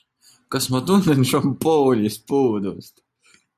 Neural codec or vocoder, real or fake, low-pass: none; real; 14.4 kHz